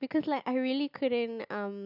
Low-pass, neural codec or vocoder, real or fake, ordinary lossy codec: 5.4 kHz; none; real; none